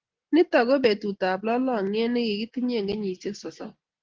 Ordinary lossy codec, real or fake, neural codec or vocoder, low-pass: Opus, 16 kbps; real; none; 7.2 kHz